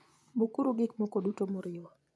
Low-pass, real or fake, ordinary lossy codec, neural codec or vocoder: none; fake; none; vocoder, 24 kHz, 100 mel bands, Vocos